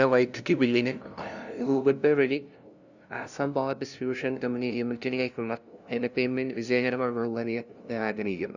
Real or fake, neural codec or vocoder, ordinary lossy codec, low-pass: fake; codec, 16 kHz, 0.5 kbps, FunCodec, trained on LibriTTS, 25 frames a second; none; 7.2 kHz